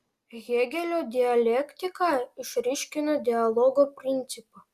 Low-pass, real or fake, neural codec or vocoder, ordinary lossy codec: 14.4 kHz; real; none; Opus, 64 kbps